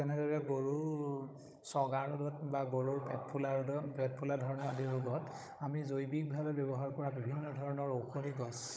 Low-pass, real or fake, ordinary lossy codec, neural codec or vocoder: none; fake; none; codec, 16 kHz, 16 kbps, FunCodec, trained on Chinese and English, 50 frames a second